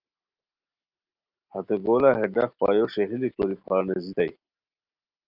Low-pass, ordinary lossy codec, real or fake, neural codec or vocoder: 5.4 kHz; Opus, 24 kbps; real; none